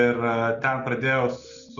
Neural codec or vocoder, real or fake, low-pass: none; real; 7.2 kHz